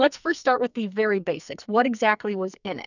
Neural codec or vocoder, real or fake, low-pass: codec, 44.1 kHz, 2.6 kbps, SNAC; fake; 7.2 kHz